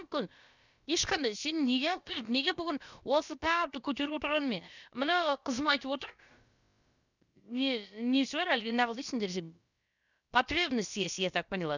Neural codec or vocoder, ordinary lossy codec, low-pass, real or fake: codec, 16 kHz, about 1 kbps, DyCAST, with the encoder's durations; none; 7.2 kHz; fake